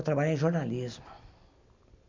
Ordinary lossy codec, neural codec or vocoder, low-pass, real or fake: MP3, 64 kbps; none; 7.2 kHz; real